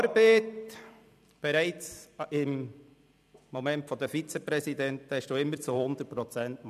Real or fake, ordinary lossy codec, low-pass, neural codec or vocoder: fake; none; 14.4 kHz; vocoder, 44.1 kHz, 128 mel bands every 256 samples, BigVGAN v2